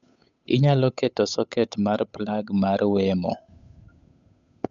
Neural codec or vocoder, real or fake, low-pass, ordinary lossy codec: codec, 16 kHz, 8 kbps, FunCodec, trained on Chinese and English, 25 frames a second; fake; 7.2 kHz; none